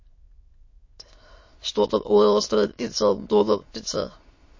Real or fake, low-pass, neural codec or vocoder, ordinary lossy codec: fake; 7.2 kHz; autoencoder, 22.05 kHz, a latent of 192 numbers a frame, VITS, trained on many speakers; MP3, 32 kbps